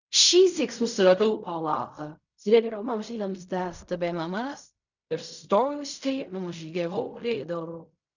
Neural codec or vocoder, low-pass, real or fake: codec, 16 kHz in and 24 kHz out, 0.4 kbps, LongCat-Audio-Codec, fine tuned four codebook decoder; 7.2 kHz; fake